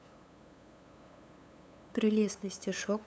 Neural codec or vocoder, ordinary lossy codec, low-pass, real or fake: codec, 16 kHz, 8 kbps, FunCodec, trained on LibriTTS, 25 frames a second; none; none; fake